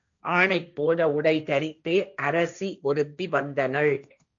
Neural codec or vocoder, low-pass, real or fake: codec, 16 kHz, 1.1 kbps, Voila-Tokenizer; 7.2 kHz; fake